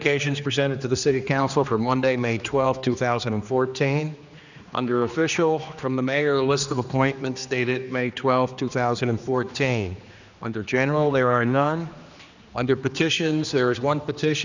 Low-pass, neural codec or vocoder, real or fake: 7.2 kHz; codec, 16 kHz, 2 kbps, X-Codec, HuBERT features, trained on general audio; fake